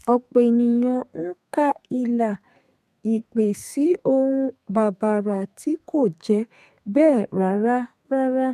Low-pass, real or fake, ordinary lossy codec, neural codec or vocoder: 14.4 kHz; fake; MP3, 96 kbps; codec, 32 kHz, 1.9 kbps, SNAC